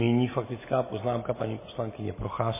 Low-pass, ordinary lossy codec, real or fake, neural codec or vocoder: 3.6 kHz; MP3, 16 kbps; real; none